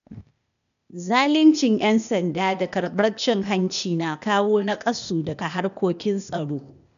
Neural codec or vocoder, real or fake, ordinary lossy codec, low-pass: codec, 16 kHz, 0.8 kbps, ZipCodec; fake; none; 7.2 kHz